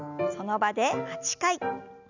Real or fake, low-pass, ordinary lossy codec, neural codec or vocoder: real; 7.2 kHz; none; none